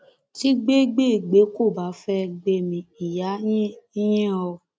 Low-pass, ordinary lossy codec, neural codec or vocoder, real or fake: none; none; none; real